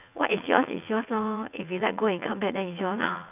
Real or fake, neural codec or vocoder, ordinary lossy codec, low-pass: fake; vocoder, 44.1 kHz, 80 mel bands, Vocos; none; 3.6 kHz